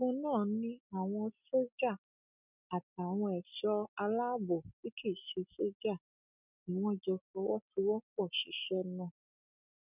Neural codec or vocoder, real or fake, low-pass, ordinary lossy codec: none; real; 3.6 kHz; none